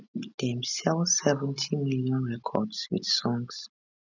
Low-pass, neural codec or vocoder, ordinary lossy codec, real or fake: 7.2 kHz; none; none; real